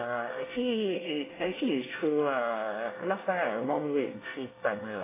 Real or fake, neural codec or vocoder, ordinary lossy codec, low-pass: fake; codec, 24 kHz, 1 kbps, SNAC; AAC, 16 kbps; 3.6 kHz